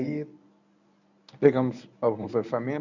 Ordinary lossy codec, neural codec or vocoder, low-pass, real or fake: none; codec, 24 kHz, 0.9 kbps, WavTokenizer, medium speech release version 1; 7.2 kHz; fake